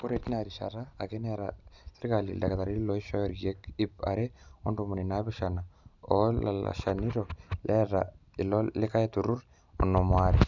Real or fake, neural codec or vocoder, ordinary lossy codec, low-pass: real; none; none; 7.2 kHz